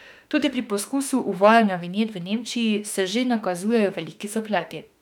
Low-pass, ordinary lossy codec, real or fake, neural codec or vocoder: 19.8 kHz; none; fake; autoencoder, 48 kHz, 32 numbers a frame, DAC-VAE, trained on Japanese speech